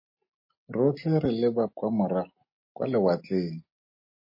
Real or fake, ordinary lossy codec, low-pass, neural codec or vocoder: real; MP3, 24 kbps; 5.4 kHz; none